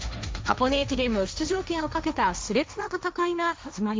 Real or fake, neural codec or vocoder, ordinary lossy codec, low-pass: fake; codec, 16 kHz, 1.1 kbps, Voila-Tokenizer; none; none